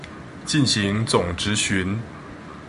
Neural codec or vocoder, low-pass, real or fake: vocoder, 24 kHz, 100 mel bands, Vocos; 10.8 kHz; fake